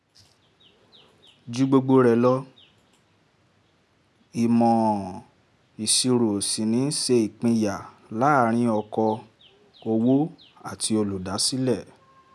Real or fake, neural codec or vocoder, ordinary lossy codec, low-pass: real; none; none; none